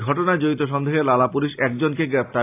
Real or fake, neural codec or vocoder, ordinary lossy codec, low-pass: real; none; AAC, 24 kbps; 3.6 kHz